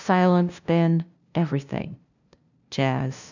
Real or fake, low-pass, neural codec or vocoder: fake; 7.2 kHz; codec, 16 kHz, 0.5 kbps, FunCodec, trained on LibriTTS, 25 frames a second